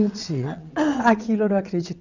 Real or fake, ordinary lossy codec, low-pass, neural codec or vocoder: fake; none; 7.2 kHz; vocoder, 22.05 kHz, 80 mel bands, WaveNeXt